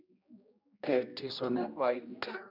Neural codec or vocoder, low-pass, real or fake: codec, 16 kHz, 1 kbps, X-Codec, HuBERT features, trained on general audio; 5.4 kHz; fake